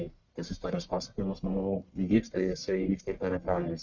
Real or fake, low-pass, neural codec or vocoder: fake; 7.2 kHz; codec, 44.1 kHz, 1.7 kbps, Pupu-Codec